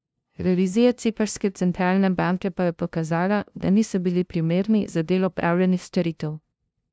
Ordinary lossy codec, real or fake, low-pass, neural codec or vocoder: none; fake; none; codec, 16 kHz, 0.5 kbps, FunCodec, trained on LibriTTS, 25 frames a second